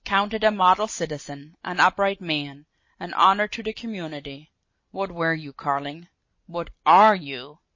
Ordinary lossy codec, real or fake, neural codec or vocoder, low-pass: MP3, 32 kbps; real; none; 7.2 kHz